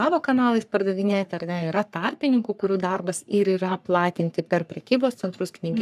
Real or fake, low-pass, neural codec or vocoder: fake; 14.4 kHz; codec, 44.1 kHz, 3.4 kbps, Pupu-Codec